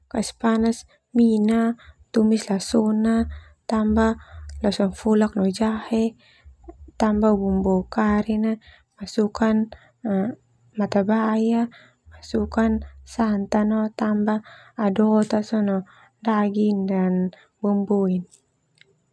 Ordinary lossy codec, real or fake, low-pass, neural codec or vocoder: none; real; none; none